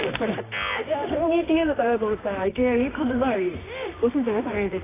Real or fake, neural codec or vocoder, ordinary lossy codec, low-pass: fake; codec, 24 kHz, 0.9 kbps, WavTokenizer, medium music audio release; AAC, 16 kbps; 3.6 kHz